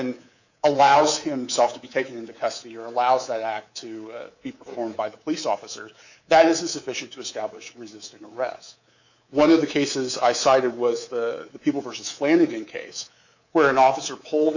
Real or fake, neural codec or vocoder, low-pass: fake; codec, 24 kHz, 3.1 kbps, DualCodec; 7.2 kHz